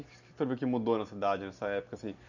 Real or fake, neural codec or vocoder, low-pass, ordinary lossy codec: real; none; 7.2 kHz; none